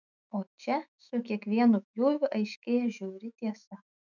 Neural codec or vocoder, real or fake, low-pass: autoencoder, 48 kHz, 128 numbers a frame, DAC-VAE, trained on Japanese speech; fake; 7.2 kHz